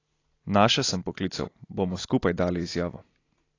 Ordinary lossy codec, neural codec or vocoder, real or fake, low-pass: AAC, 32 kbps; none; real; 7.2 kHz